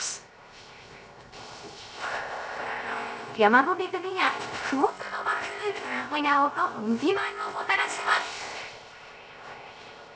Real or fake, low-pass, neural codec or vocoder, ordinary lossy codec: fake; none; codec, 16 kHz, 0.3 kbps, FocalCodec; none